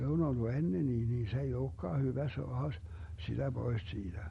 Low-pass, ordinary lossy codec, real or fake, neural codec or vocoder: 19.8 kHz; MP3, 48 kbps; real; none